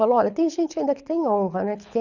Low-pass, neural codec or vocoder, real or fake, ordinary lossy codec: 7.2 kHz; codec, 24 kHz, 6 kbps, HILCodec; fake; none